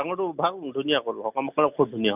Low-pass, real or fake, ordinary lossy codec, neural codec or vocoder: 3.6 kHz; real; none; none